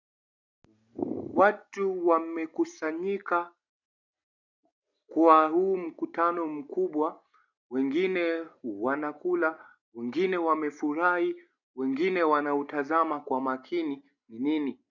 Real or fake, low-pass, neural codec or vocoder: real; 7.2 kHz; none